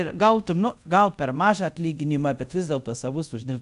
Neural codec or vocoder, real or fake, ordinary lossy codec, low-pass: codec, 24 kHz, 0.5 kbps, DualCodec; fake; AAC, 96 kbps; 10.8 kHz